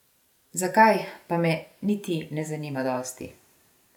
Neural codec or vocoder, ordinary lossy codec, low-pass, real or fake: vocoder, 44.1 kHz, 128 mel bands every 512 samples, BigVGAN v2; none; 19.8 kHz; fake